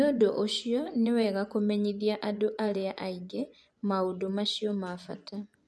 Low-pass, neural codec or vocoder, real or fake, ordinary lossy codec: none; vocoder, 24 kHz, 100 mel bands, Vocos; fake; none